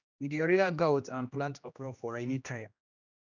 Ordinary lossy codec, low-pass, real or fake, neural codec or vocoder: none; 7.2 kHz; fake; codec, 16 kHz, 1 kbps, X-Codec, HuBERT features, trained on general audio